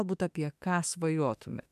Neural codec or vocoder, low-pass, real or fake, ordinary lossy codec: autoencoder, 48 kHz, 32 numbers a frame, DAC-VAE, trained on Japanese speech; 14.4 kHz; fake; MP3, 96 kbps